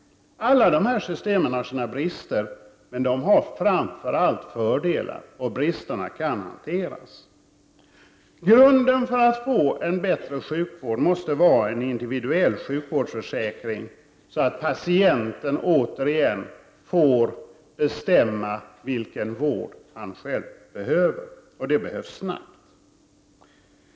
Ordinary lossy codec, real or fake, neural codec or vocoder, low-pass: none; real; none; none